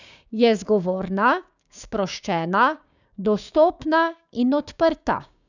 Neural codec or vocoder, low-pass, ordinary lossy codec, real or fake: codec, 16 kHz, 6 kbps, DAC; 7.2 kHz; none; fake